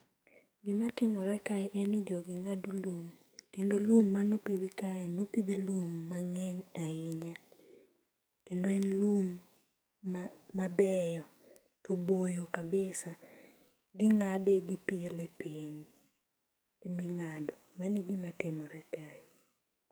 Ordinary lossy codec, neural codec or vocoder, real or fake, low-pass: none; codec, 44.1 kHz, 2.6 kbps, SNAC; fake; none